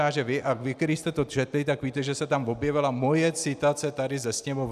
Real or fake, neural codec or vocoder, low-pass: real; none; 14.4 kHz